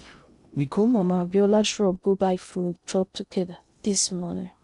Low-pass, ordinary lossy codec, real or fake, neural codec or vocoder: 10.8 kHz; none; fake; codec, 16 kHz in and 24 kHz out, 0.6 kbps, FocalCodec, streaming, 2048 codes